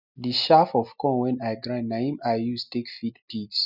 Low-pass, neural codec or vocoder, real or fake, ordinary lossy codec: 5.4 kHz; none; real; none